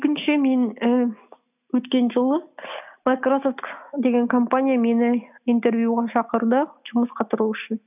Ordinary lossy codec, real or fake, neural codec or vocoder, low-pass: none; real; none; 3.6 kHz